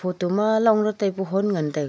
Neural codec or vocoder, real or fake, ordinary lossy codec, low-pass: none; real; none; none